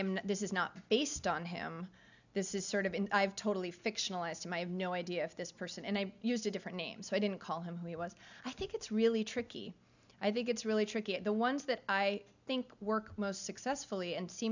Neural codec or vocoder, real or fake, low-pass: none; real; 7.2 kHz